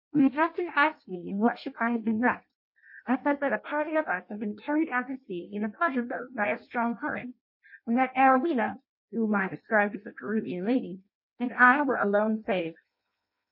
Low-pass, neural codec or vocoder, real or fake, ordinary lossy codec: 5.4 kHz; codec, 16 kHz in and 24 kHz out, 0.6 kbps, FireRedTTS-2 codec; fake; MP3, 32 kbps